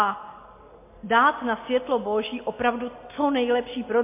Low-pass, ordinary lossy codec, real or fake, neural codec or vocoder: 3.6 kHz; MP3, 24 kbps; real; none